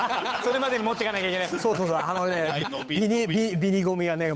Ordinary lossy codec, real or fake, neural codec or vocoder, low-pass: none; fake; codec, 16 kHz, 8 kbps, FunCodec, trained on Chinese and English, 25 frames a second; none